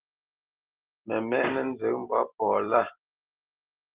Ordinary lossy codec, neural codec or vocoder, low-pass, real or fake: Opus, 16 kbps; none; 3.6 kHz; real